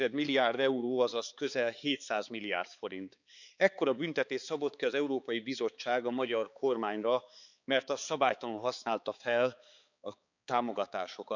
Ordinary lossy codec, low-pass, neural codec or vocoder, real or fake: none; 7.2 kHz; codec, 16 kHz, 4 kbps, X-Codec, HuBERT features, trained on balanced general audio; fake